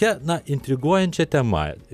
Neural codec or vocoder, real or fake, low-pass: none; real; 14.4 kHz